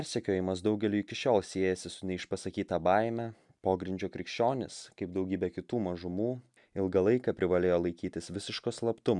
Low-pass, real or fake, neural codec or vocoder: 10.8 kHz; real; none